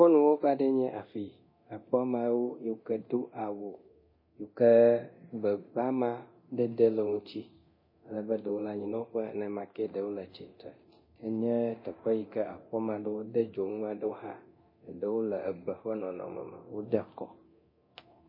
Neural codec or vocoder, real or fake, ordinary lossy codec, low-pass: codec, 24 kHz, 0.9 kbps, DualCodec; fake; MP3, 24 kbps; 5.4 kHz